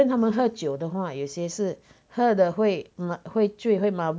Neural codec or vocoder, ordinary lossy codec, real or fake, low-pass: none; none; real; none